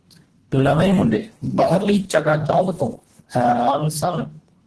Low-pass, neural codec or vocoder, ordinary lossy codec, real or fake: 10.8 kHz; codec, 24 kHz, 1.5 kbps, HILCodec; Opus, 16 kbps; fake